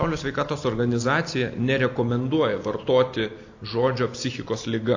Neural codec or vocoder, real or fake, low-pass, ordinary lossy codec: none; real; 7.2 kHz; AAC, 48 kbps